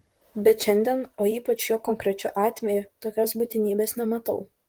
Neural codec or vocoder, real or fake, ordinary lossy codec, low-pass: vocoder, 44.1 kHz, 128 mel bands, Pupu-Vocoder; fake; Opus, 24 kbps; 19.8 kHz